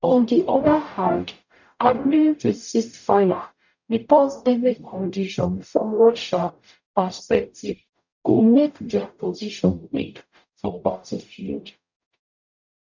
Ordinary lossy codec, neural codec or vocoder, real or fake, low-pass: none; codec, 44.1 kHz, 0.9 kbps, DAC; fake; 7.2 kHz